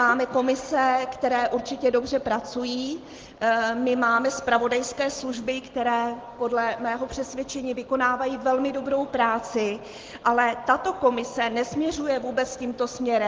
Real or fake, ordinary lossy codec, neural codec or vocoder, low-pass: real; Opus, 16 kbps; none; 7.2 kHz